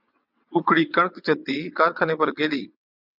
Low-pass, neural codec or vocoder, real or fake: 5.4 kHz; vocoder, 22.05 kHz, 80 mel bands, WaveNeXt; fake